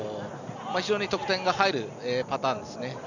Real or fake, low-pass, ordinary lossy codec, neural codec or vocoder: real; 7.2 kHz; none; none